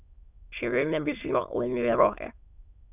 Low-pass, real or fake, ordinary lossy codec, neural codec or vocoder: 3.6 kHz; fake; none; autoencoder, 22.05 kHz, a latent of 192 numbers a frame, VITS, trained on many speakers